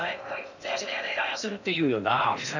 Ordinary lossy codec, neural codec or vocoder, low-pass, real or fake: none; codec, 16 kHz in and 24 kHz out, 0.6 kbps, FocalCodec, streaming, 2048 codes; 7.2 kHz; fake